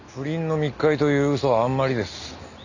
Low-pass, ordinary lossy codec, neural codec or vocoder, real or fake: 7.2 kHz; none; none; real